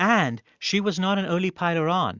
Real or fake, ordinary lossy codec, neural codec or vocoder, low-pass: real; Opus, 64 kbps; none; 7.2 kHz